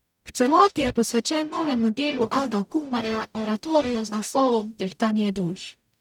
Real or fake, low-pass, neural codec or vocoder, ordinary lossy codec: fake; 19.8 kHz; codec, 44.1 kHz, 0.9 kbps, DAC; none